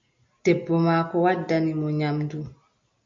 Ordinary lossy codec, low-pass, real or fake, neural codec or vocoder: MP3, 48 kbps; 7.2 kHz; real; none